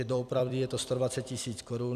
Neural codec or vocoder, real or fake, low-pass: vocoder, 44.1 kHz, 128 mel bands every 256 samples, BigVGAN v2; fake; 14.4 kHz